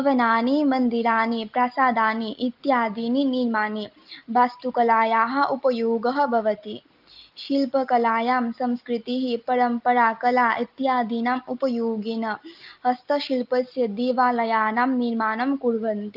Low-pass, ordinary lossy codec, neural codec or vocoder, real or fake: 5.4 kHz; Opus, 32 kbps; none; real